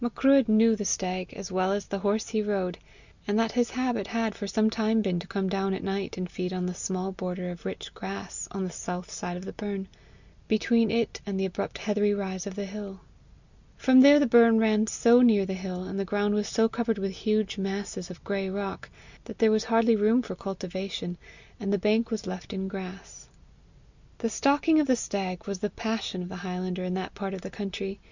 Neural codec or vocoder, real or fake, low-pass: none; real; 7.2 kHz